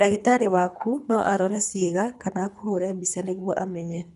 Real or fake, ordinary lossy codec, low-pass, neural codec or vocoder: fake; none; 10.8 kHz; codec, 24 kHz, 3 kbps, HILCodec